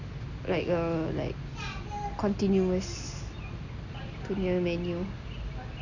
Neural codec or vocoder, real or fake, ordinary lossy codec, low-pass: none; real; none; 7.2 kHz